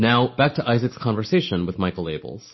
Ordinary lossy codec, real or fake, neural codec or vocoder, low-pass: MP3, 24 kbps; real; none; 7.2 kHz